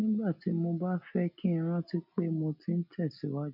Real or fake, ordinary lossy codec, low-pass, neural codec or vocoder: real; none; 5.4 kHz; none